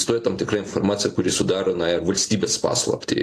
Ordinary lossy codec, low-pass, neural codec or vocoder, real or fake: AAC, 48 kbps; 14.4 kHz; none; real